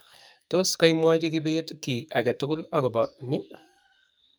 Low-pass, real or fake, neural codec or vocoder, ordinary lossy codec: none; fake; codec, 44.1 kHz, 2.6 kbps, SNAC; none